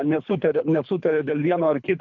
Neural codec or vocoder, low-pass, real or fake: codec, 24 kHz, 3 kbps, HILCodec; 7.2 kHz; fake